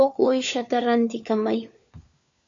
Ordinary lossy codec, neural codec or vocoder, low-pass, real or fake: MP3, 64 kbps; codec, 16 kHz, 4 kbps, FunCodec, trained on LibriTTS, 50 frames a second; 7.2 kHz; fake